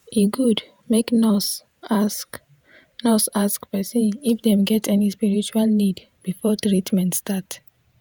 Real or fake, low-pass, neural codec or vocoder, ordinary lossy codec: fake; none; vocoder, 48 kHz, 128 mel bands, Vocos; none